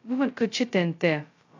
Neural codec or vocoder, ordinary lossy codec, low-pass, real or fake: codec, 16 kHz, 0.2 kbps, FocalCodec; AAC, 48 kbps; 7.2 kHz; fake